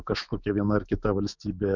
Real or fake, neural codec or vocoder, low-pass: fake; autoencoder, 48 kHz, 128 numbers a frame, DAC-VAE, trained on Japanese speech; 7.2 kHz